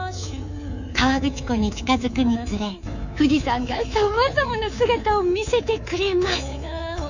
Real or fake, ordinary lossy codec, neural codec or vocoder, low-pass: fake; none; codec, 24 kHz, 3.1 kbps, DualCodec; 7.2 kHz